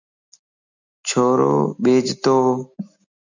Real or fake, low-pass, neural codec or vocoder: real; 7.2 kHz; none